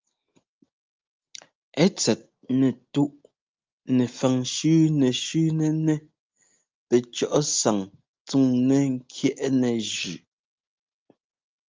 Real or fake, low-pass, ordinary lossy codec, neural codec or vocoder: real; 7.2 kHz; Opus, 24 kbps; none